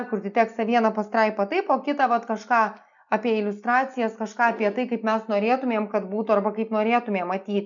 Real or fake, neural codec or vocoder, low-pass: real; none; 7.2 kHz